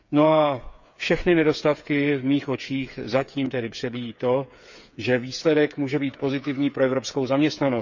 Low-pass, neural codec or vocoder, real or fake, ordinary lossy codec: 7.2 kHz; codec, 16 kHz, 8 kbps, FreqCodec, smaller model; fake; none